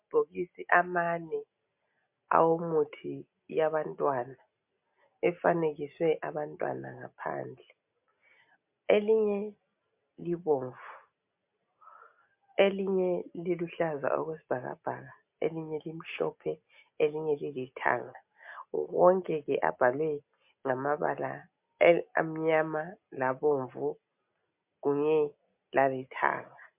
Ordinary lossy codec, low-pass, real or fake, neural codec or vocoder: MP3, 32 kbps; 3.6 kHz; real; none